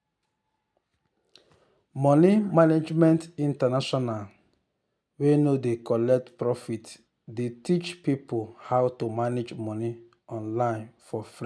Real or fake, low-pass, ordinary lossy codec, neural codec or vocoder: real; none; none; none